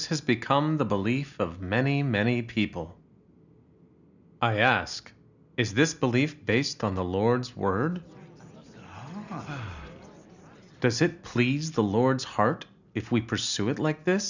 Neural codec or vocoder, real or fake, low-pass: none; real; 7.2 kHz